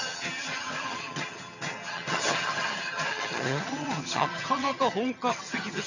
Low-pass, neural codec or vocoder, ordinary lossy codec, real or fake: 7.2 kHz; vocoder, 22.05 kHz, 80 mel bands, HiFi-GAN; none; fake